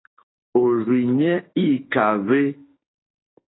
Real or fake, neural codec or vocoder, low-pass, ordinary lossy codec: fake; autoencoder, 48 kHz, 32 numbers a frame, DAC-VAE, trained on Japanese speech; 7.2 kHz; AAC, 16 kbps